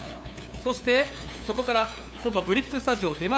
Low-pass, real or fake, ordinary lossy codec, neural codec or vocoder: none; fake; none; codec, 16 kHz, 2 kbps, FunCodec, trained on LibriTTS, 25 frames a second